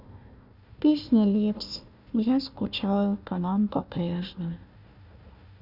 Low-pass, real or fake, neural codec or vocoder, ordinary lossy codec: 5.4 kHz; fake; codec, 16 kHz, 1 kbps, FunCodec, trained on Chinese and English, 50 frames a second; none